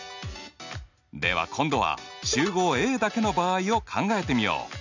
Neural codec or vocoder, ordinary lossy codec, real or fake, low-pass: none; none; real; 7.2 kHz